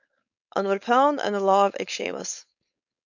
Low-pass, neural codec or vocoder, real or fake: 7.2 kHz; codec, 16 kHz, 4.8 kbps, FACodec; fake